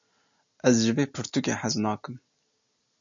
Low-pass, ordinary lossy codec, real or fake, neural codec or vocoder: 7.2 kHz; AAC, 64 kbps; real; none